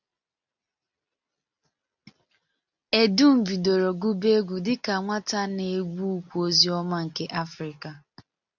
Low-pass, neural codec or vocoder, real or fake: 7.2 kHz; none; real